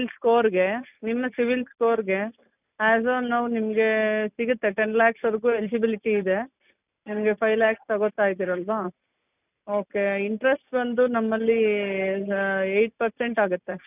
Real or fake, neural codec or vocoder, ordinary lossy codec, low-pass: real; none; none; 3.6 kHz